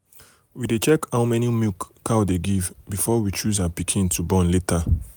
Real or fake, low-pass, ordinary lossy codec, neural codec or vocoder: real; none; none; none